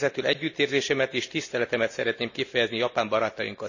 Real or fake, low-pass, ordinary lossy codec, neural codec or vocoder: real; 7.2 kHz; none; none